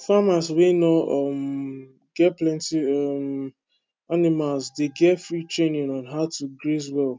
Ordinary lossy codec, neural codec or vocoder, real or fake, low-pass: none; none; real; none